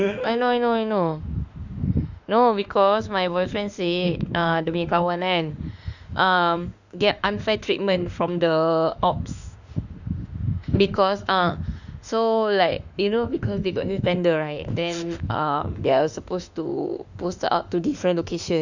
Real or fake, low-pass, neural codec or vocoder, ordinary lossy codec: fake; 7.2 kHz; autoencoder, 48 kHz, 32 numbers a frame, DAC-VAE, trained on Japanese speech; none